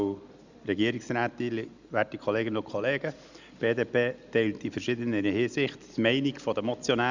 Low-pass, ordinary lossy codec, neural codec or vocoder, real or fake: 7.2 kHz; Opus, 64 kbps; none; real